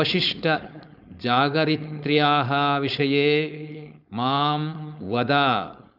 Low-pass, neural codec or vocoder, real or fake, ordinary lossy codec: 5.4 kHz; codec, 16 kHz, 4.8 kbps, FACodec; fake; none